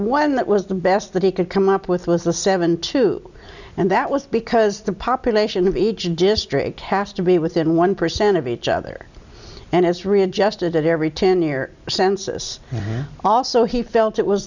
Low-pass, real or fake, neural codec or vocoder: 7.2 kHz; real; none